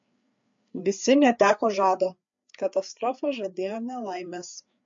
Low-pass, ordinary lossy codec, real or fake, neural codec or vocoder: 7.2 kHz; MP3, 48 kbps; fake; codec, 16 kHz, 4 kbps, FreqCodec, larger model